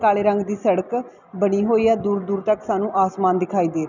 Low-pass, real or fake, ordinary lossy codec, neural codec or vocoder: 7.2 kHz; real; none; none